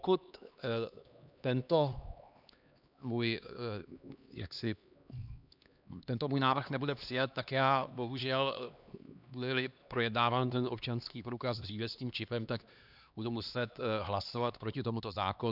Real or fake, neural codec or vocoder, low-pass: fake; codec, 16 kHz, 2 kbps, X-Codec, HuBERT features, trained on LibriSpeech; 5.4 kHz